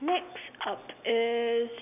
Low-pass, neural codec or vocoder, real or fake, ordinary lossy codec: 3.6 kHz; none; real; none